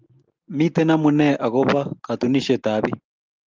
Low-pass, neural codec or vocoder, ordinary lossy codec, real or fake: 7.2 kHz; none; Opus, 32 kbps; real